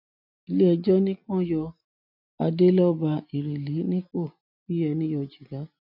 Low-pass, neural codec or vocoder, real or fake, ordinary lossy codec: 5.4 kHz; none; real; none